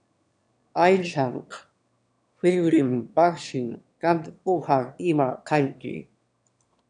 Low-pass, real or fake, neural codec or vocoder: 9.9 kHz; fake; autoencoder, 22.05 kHz, a latent of 192 numbers a frame, VITS, trained on one speaker